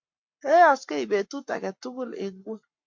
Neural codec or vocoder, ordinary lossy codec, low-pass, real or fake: codec, 44.1 kHz, 7.8 kbps, Pupu-Codec; MP3, 48 kbps; 7.2 kHz; fake